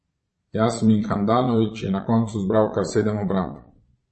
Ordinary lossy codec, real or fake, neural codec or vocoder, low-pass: MP3, 32 kbps; fake; vocoder, 22.05 kHz, 80 mel bands, Vocos; 9.9 kHz